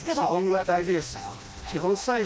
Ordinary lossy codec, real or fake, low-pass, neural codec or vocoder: none; fake; none; codec, 16 kHz, 1 kbps, FreqCodec, smaller model